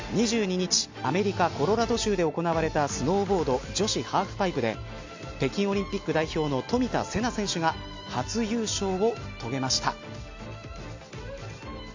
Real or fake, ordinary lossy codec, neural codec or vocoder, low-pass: real; MP3, 48 kbps; none; 7.2 kHz